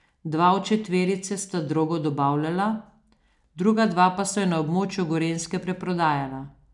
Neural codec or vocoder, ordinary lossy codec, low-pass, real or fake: none; none; 10.8 kHz; real